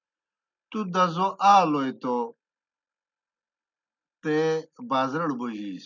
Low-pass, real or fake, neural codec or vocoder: 7.2 kHz; real; none